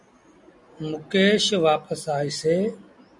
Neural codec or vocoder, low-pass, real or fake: none; 10.8 kHz; real